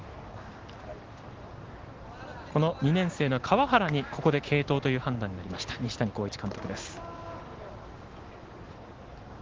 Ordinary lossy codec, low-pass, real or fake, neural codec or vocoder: Opus, 16 kbps; 7.2 kHz; real; none